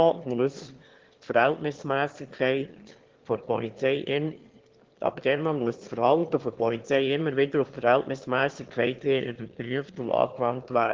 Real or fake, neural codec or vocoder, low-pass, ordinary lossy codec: fake; autoencoder, 22.05 kHz, a latent of 192 numbers a frame, VITS, trained on one speaker; 7.2 kHz; Opus, 16 kbps